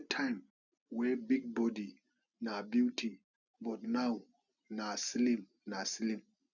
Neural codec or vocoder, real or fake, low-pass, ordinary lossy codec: none; real; 7.2 kHz; none